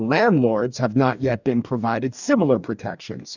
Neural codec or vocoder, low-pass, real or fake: codec, 44.1 kHz, 2.6 kbps, DAC; 7.2 kHz; fake